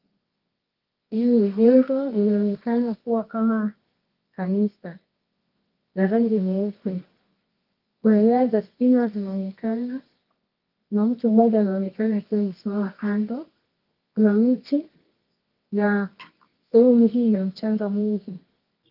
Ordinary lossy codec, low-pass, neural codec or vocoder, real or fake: Opus, 32 kbps; 5.4 kHz; codec, 24 kHz, 0.9 kbps, WavTokenizer, medium music audio release; fake